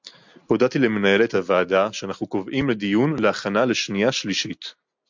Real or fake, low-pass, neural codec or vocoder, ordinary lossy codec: real; 7.2 kHz; none; MP3, 64 kbps